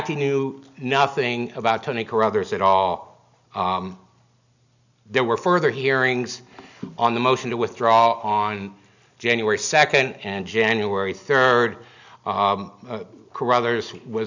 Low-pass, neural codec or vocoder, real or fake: 7.2 kHz; none; real